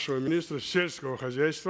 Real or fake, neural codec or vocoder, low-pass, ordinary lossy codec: real; none; none; none